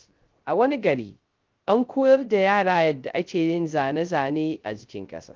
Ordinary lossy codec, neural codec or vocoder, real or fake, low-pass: Opus, 32 kbps; codec, 16 kHz, 0.3 kbps, FocalCodec; fake; 7.2 kHz